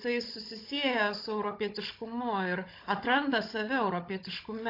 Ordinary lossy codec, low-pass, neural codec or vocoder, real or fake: AAC, 32 kbps; 5.4 kHz; codec, 16 kHz, 16 kbps, FunCodec, trained on Chinese and English, 50 frames a second; fake